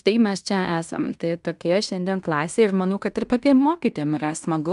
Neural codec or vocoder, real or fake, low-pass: codec, 16 kHz in and 24 kHz out, 0.9 kbps, LongCat-Audio-Codec, fine tuned four codebook decoder; fake; 10.8 kHz